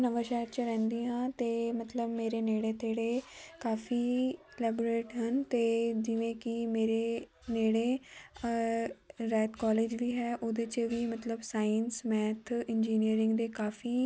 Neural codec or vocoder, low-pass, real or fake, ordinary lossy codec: none; none; real; none